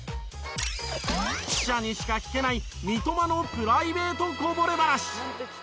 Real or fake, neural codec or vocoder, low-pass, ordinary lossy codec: real; none; none; none